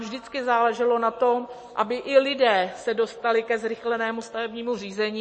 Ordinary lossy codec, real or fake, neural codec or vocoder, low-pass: MP3, 32 kbps; real; none; 9.9 kHz